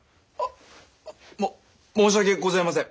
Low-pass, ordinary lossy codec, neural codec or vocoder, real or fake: none; none; none; real